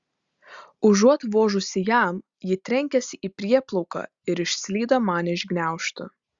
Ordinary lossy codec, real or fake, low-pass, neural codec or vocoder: Opus, 64 kbps; real; 7.2 kHz; none